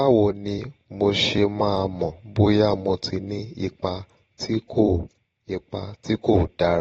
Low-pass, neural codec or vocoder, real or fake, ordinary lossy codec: 19.8 kHz; vocoder, 44.1 kHz, 128 mel bands every 256 samples, BigVGAN v2; fake; AAC, 24 kbps